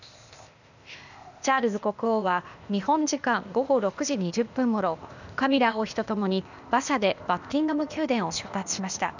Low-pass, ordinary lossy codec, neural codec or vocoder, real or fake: 7.2 kHz; none; codec, 16 kHz, 0.8 kbps, ZipCodec; fake